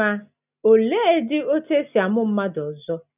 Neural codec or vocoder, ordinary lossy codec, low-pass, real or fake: none; none; 3.6 kHz; real